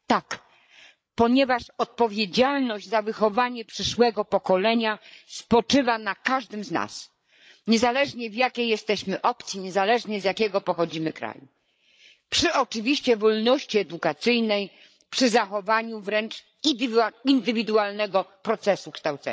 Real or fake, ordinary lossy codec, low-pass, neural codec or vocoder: fake; none; none; codec, 16 kHz, 8 kbps, FreqCodec, larger model